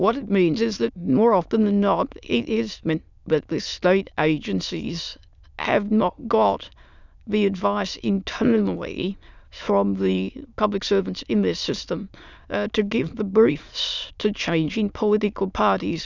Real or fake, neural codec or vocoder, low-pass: fake; autoencoder, 22.05 kHz, a latent of 192 numbers a frame, VITS, trained on many speakers; 7.2 kHz